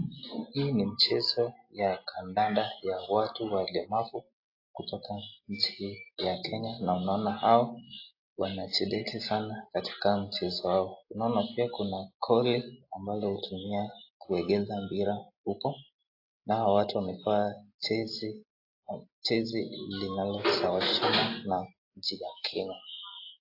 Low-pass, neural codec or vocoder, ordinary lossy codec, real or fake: 5.4 kHz; none; AAC, 32 kbps; real